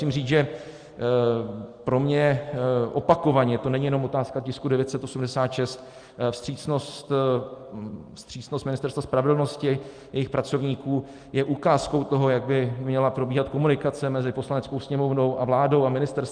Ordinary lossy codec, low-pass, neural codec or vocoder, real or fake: Opus, 32 kbps; 9.9 kHz; none; real